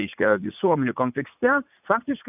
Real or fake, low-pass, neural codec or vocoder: fake; 3.6 kHz; vocoder, 44.1 kHz, 80 mel bands, Vocos